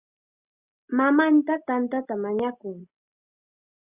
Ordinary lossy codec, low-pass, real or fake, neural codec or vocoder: Opus, 64 kbps; 3.6 kHz; real; none